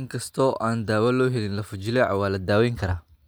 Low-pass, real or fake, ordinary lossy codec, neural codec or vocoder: none; real; none; none